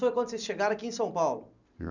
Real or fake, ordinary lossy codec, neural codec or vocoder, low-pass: real; none; none; 7.2 kHz